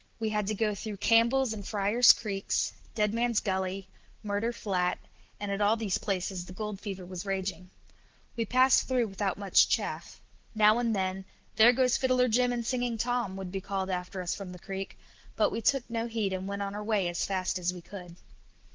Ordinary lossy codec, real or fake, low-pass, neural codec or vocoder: Opus, 16 kbps; real; 7.2 kHz; none